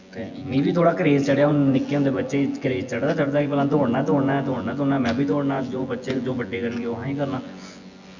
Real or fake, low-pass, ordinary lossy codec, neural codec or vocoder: fake; 7.2 kHz; Opus, 64 kbps; vocoder, 24 kHz, 100 mel bands, Vocos